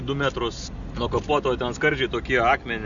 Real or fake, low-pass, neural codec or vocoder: real; 7.2 kHz; none